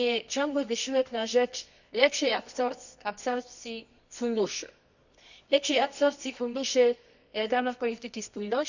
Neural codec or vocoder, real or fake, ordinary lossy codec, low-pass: codec, 24 kHz, 0.9 kbps, WavTokenizer, medium music audio release; fake; none; 7.2 kHz